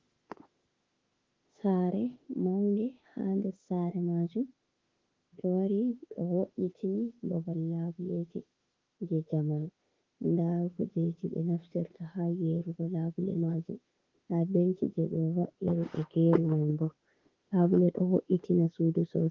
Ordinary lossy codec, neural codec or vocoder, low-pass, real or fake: Opus, 16 kbps; autoencoder, 48 kHz, 32 numbers a frame, DAC-VAE, trained on Japanese speech; 7.2 kHz; fake